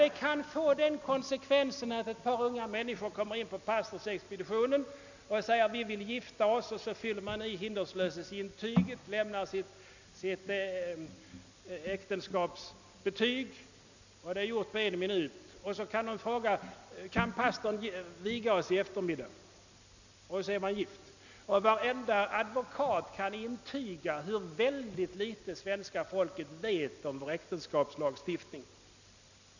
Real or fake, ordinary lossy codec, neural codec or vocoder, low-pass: real; none; none; 7.2 kHz